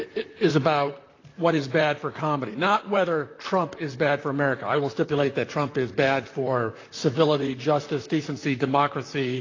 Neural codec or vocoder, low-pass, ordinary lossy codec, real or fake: vocoder, 44.1 kHz, 128 mel bands, Pupu-Vocoder; 7.2 kHz; AAC, 32 kbps; fake